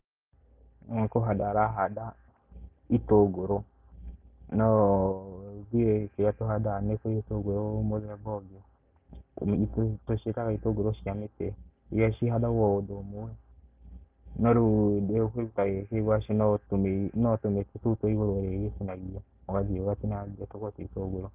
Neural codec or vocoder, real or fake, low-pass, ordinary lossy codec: none; real; 3.6 kHz; Opus, 24 kbps